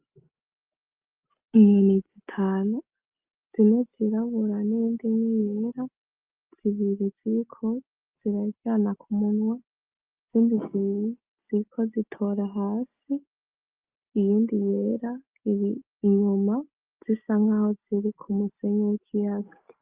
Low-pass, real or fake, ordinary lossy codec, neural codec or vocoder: 3.6 kHz; real; Opus, 24 kbps; none